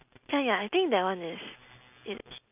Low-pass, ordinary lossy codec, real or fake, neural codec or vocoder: 3.6 kHz; none; real; none